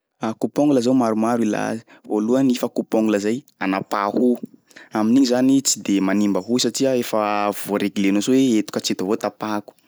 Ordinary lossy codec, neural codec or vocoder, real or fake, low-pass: none; none; real; none